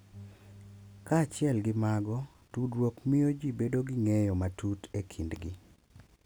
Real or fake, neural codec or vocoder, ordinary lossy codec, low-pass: real; none; none; none